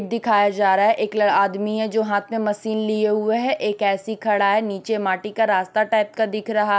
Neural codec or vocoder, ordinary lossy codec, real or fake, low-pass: none; none; real; none